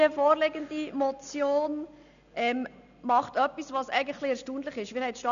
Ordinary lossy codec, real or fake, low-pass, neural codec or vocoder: none; real; 7.2 kHz; none